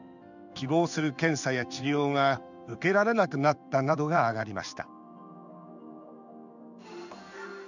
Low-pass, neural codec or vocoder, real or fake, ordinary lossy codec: 7.2 kHz; codec, 16 kHz in and 24 kHz out, 1 kbps, XY-Tokenizer; fake; none